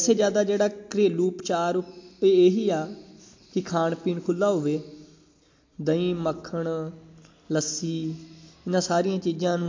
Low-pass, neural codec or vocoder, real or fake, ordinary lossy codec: 7.2 kHz; none; real; MP3, 48 kbps